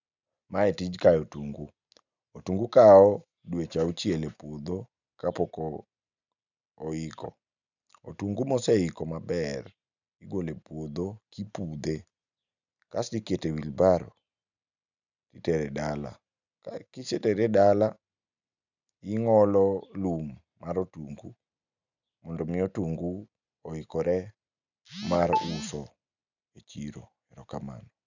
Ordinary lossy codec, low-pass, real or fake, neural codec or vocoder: none; 7.2 kHz; real; none